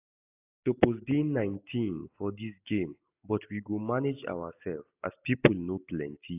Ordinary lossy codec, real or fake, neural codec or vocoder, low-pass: AAC, 32 kbps; real; none; 3.6 kHz